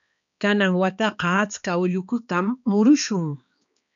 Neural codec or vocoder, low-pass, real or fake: codec, 16 kHz, 2 kbps, X-Codec, HuBERT features, trained on balanced general audio; 7.2 kHz; fake